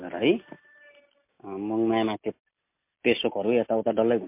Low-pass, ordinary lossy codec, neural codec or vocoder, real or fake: 3.6 kHz; MP3, 24 kbps; none; real